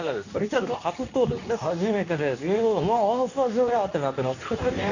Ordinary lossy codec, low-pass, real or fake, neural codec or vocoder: none; 7.2 kHz; fake; codec, 24 kHz, 0.9 kbps, WavTokenizer, medium speech release version 2